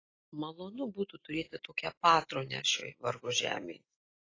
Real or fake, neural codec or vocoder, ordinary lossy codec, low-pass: real; none; AAC, 32 kbps; 7.2 kHz